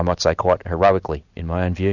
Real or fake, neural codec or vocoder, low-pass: real; none; 7.2 kHz